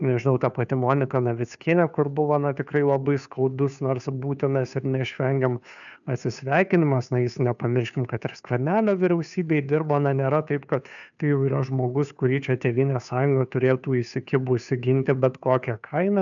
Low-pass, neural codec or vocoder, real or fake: 7.2 kHz; codec, 16 kHz, 2 kbps, FunCodec, trained on Chinese and English, 25 frames a second; fake